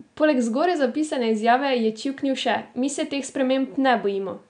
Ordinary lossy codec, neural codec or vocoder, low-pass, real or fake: none; none; 9.9 kHz; real